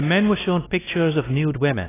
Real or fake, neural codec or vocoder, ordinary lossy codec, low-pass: fake; codec, 16 kHz, 1 kbps, X-Codec, WavLM features, trained on Multilingual LibriSpeech; AAC, 16 kbps; 3.6 kHz